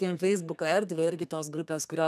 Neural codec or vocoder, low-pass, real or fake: codec, 32 kHz, 1.9 kbps, SNAC; 14.4 kHz; fake